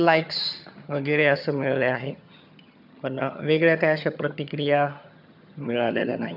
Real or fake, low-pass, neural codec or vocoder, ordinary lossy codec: fake; 5.4 kHz; vocoder, 22.05 kHz, 80 mel bands, HiFi-GAN; none